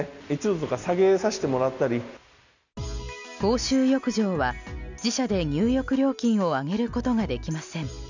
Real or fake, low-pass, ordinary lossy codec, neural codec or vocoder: real; 7.2 kHz; none; none